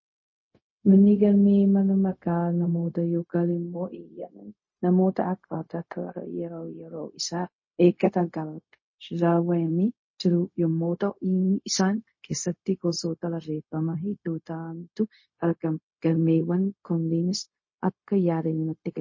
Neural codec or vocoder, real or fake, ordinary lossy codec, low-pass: codec, 16 kHz, 0.4 kbps, LongCat-Audio-Codec; fake; MP3, 32 kbps; 7.2 kHz